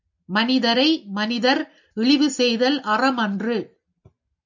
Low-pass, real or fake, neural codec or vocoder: 7.2 kHz; real; none